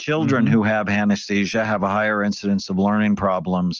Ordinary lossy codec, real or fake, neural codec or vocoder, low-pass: Opus, 24 kbps; real; none; 7.2 kHz